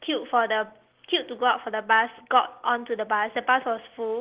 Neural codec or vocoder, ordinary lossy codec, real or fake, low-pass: none; Opus, 16 kbps; real; 3.6 kHz